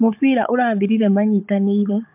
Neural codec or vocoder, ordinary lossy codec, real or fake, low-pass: codec, 16 kHz, 16 kbps, FunCodec, trained on Chinese and English, 50 frames a second; MP3, 32 kbps; fake; 3.6 kHz